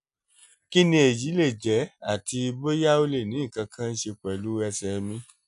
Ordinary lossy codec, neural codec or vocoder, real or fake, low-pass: none; none; real; 10.8 kHz